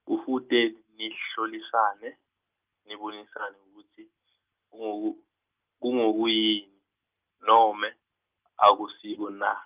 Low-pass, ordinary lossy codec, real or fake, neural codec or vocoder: 3.6 kHz; Opus, 24 kbps; real; none